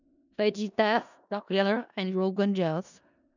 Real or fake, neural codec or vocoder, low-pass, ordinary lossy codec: fake; codec, 16 kHz in and 24 kHz out, 0.4 kbps, LongCat-Audio-Codec, four codebook decoder; 7.2 kHz; none